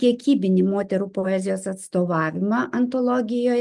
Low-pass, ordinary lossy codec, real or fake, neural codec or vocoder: 10.8 kHz; Opus, 32 kbps; real; none